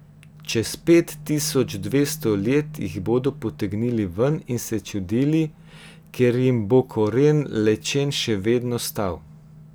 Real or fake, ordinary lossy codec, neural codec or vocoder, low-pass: real; none; none; none